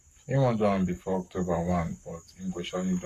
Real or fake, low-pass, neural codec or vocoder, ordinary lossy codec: fake; 14.4 kHz; codec, 44.1 kHz, 7.8 kbps, Pupu-Codec; none